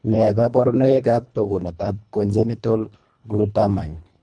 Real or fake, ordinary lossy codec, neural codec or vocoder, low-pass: fake; none; codec, 24 kHz, 1.5 kbps, HILCodec; 9.9 kHz